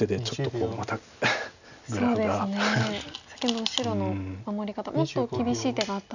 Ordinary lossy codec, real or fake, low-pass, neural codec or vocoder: none; real; 7.2 kHz; none